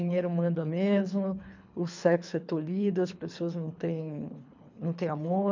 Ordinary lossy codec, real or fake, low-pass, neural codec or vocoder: MP3, 64 kbps; fake; 7.2 kHz; codec, 24 kHz, 3 kbps, HILCodec